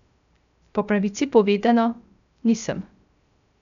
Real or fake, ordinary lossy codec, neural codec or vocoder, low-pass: fake; none; codec, 16 kHz, 0.3 kbps, FocalCodec; 7.2 kHz